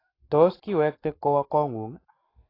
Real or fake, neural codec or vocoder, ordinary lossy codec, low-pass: fake; codec, 44.1 kHz, 7.8 kbps, Pupu-Codec; AAC, 24 kbps; 5.4 kHz